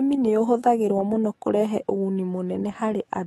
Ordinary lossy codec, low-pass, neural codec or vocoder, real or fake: AAC, 32 kbps; 19.8 kHz; autoencoder, 48 kHz, 128 numbers a frame, DAC-VAE, trained on Japanese speech; fake